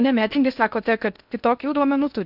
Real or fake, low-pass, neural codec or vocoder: fake; 5.4 kHz; codec, 16 kHz in and 24 kHz out, 0.6 kbps, FocalCodec, streaming, 2048 codes